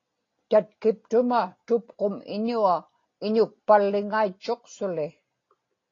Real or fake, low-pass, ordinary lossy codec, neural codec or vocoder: real; 7.2 kHz; AAC, 32 kbps; none